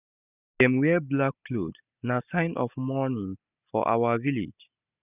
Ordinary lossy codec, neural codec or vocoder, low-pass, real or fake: none; none; 3.6 kHz; real